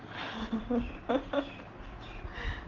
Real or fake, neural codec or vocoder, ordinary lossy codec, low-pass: real; none; Opus, 16 kbps; 7.2 kHz